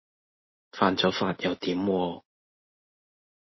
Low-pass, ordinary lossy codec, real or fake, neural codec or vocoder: 7.2 kHz; MP3, 24 kbps; real; none